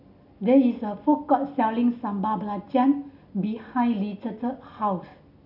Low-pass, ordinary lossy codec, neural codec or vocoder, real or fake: 5.4 kHz; none; none; real